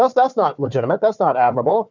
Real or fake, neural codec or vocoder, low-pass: fake; codec, 16 kHz, 4 kbps, FunCodec, trained on Chinese and English, 50 frames a second; 7.2 kHz